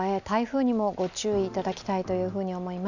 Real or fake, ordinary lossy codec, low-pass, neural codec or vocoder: real; none; 7.2 kHz; none